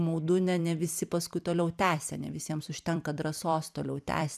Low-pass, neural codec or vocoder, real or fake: 14.4 kHz; vocoder, 44.1 kHz, 128 mel bands every 256 samples, BigVGAN v2; fake